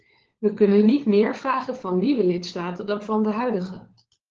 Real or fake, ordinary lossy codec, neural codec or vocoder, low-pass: fake; Opus, 16 kbps; codec, 16 kHz, 4 kbps, FunCodec, trained on LibriTTS, 50 frames a second; 7.2 kHz